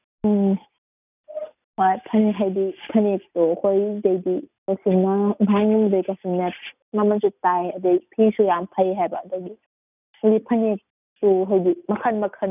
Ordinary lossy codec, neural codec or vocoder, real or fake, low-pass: none; none; real; 3.6 kHz